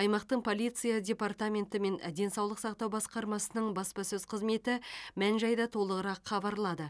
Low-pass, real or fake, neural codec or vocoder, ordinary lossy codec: none; real; none; none